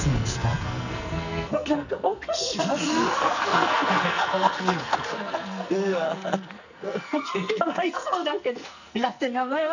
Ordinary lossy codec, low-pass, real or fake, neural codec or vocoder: none; 7.2 kHz; fake; codec, 44.1 kHz, 2.6 kbps, SNAC